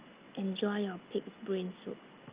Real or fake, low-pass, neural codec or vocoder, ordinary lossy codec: real; 3.6 kHz; none; Opus, 24 kbps